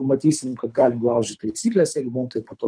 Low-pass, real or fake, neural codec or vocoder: 9.9 kHz; fake; codec, 24 kHz, 3 kbps, HILCodec